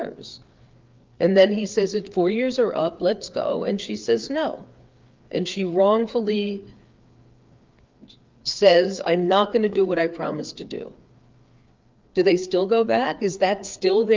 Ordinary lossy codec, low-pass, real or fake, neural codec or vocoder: Opus, 32 kbps; 7.2 kHz; fake; codec, 16 kHz, 4 kbps, FreqCodec, larger model